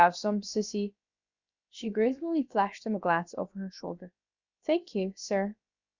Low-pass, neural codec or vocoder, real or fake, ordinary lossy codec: 7.2 kHz; codec, 16 kHz, about 1 kbps, DyCAST, with the encoder's durations; fake; Opus, 64 kbps